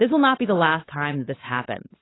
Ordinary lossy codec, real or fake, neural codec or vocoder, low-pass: AAC, 16 kbps; fake; codec, 16 kHz, 4.8 kbps, FACodec; 7.2 kHz